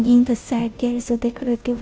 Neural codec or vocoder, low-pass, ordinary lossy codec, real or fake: codec, 16 kHz, 0.5 kbps, FunCodec, trained on Chinese and English, 25 frames a second; none; none; fake